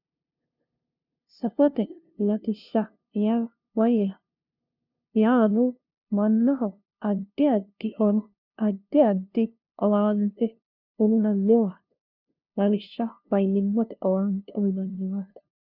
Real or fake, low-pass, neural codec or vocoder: fake; 5.4 kHz; codec, 16 kHz, 0.5 kbps, FunCodec, trained on LibriTTS, 25 frames a second